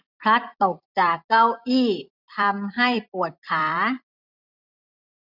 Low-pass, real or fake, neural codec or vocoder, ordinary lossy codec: 5.4 kHz; fake; vocoder, 44.1 kHz, 128 mel bands every 256 samples, BigVGAN v2; none